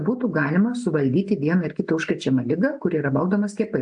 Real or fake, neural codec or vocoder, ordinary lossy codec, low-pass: real; none; AAC, 64 kbps; 9.9 kHz